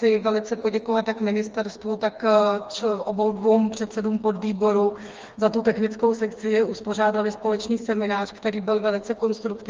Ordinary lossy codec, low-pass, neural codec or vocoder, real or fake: Opus, 32 kbps; 7.2 kHz; codec, 16 kHz, 2 kbps, FreqCodec, smaller model; fake